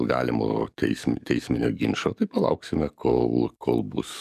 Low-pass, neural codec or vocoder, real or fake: 14.4 kHz; codec, 44.1 kHz, 7.8 kbps, DAC; fake